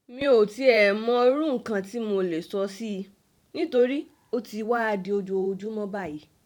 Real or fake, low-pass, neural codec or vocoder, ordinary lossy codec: fake; 19.8 kHz; vocoder, 44.1 kHz, 128 mel bands every 512 samples, BigVGAN v2; none